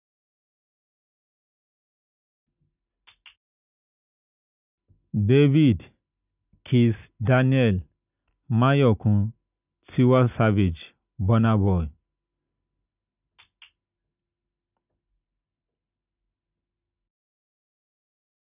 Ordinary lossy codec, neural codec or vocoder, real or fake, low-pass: none; none; real; 3.6 kHz